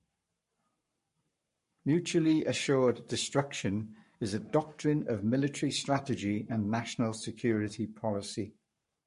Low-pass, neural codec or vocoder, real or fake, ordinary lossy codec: 14.4 kHz; codec, 44.1 kHz, 7.8 kbps, Pupu-Codec; fake; MP3, 48 kbps